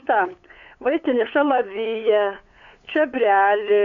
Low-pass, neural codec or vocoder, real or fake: 7.2 kHz; codec, 16 kHz, 8 kbps, FunCodec, trained on LibriTTS, 25 frames a second; fake